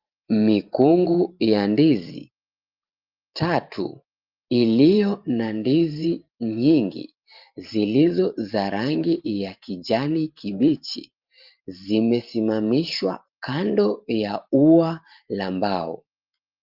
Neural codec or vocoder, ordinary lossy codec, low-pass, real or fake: vocoder, 44.1 kHz, 128 mel bands every 512 samples, BigVGAN v2; Opus, 24 kbps; 5.4 kHz; fake